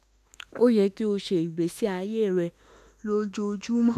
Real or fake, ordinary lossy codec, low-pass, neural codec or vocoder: fake; none; 14.4 kHz; autoencoder, 48 kHz, 32 numbers a frame, DAC-VAE, trained on Japanese speech